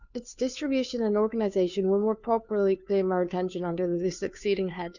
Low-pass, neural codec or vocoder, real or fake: 7.2 kHz; codec, 16 kHz, 2 kbps, FunCodec, trained on Chinese and English, 25 frames a second; fake